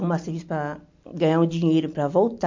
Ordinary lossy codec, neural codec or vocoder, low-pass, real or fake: MP3, 48 kbps; none; 7.2 kHz; real